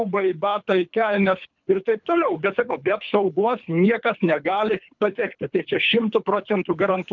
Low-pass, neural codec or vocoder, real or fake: 7.2 kHz; codec, 24 kHz, 3 kbps, HILCodec; fake